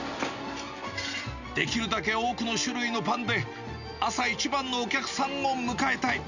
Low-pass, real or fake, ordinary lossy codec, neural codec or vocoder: 7.2 kHz; real; none; none